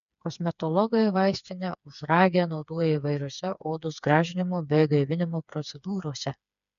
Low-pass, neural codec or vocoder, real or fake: 7.2 kHz; codec, 16 kHz, 4 kbps, FreqCodec, smaller model; fake